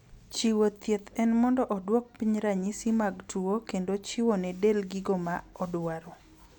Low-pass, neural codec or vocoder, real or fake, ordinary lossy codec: 19.8 kHz; none; real; none